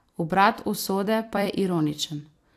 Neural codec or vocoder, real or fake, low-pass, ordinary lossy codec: vocoder, 44.1 kHz, 128 mel bands every 256 samples, BigVGAN v2; fake; 14.4 kHz; AAC, 64 kbps